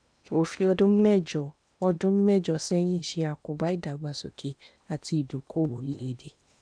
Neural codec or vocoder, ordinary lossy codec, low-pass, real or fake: codec, 16 kHz in and 24 kHz out, 0.8 kbps, FocalCodec, streaming, 65536 codes; none; 9.9 kHz; fake